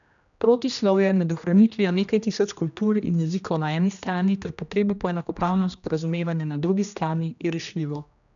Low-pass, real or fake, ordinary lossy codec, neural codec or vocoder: 7.2 kHz; fake; none; codec, 16 kHz, 1 kbps, X-Codec, HuBERT features, trained on general audio